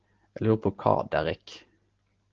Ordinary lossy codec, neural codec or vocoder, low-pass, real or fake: Opus, 16 kbps; none; 7.2 kHz; real